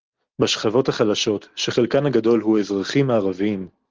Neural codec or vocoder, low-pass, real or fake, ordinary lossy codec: none; 7.2 kHz; real; Opus, 16 kbps